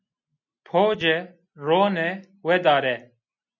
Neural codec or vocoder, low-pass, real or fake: none; 7.2 kHz; real